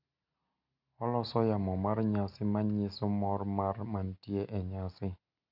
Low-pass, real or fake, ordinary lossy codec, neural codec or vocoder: 5.4 kHz; real; none; none